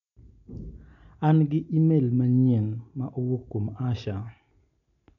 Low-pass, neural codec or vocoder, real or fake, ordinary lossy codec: 7.2 kHz; none; real; none